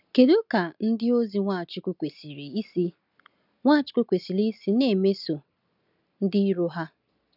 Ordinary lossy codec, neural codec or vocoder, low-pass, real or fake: none; none; 5.4 kHz; real